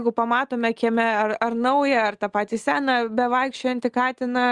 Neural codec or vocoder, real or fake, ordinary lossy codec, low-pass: none; real; Opus, 32 kbps; 10.8 kHz